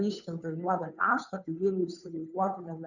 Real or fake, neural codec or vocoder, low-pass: fake; codec, 16 kHz, 2 kbps, FunCodec, trained on Chinese and English, 25 frames a second; 7.2 kHz